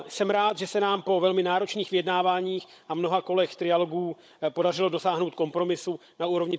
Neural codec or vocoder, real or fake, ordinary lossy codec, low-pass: codec, 16 kHz, 16 kbps, FunCodec, trained on Chinese and English, 50 frames a second; fake; none; none